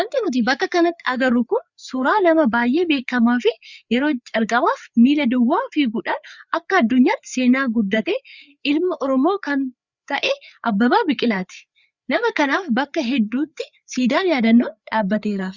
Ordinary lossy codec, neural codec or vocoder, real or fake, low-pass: Opus, 64 kbps; codec, 16 kHz, 4 kbps, FreqCodec, larger model; fake; 7.2 kHz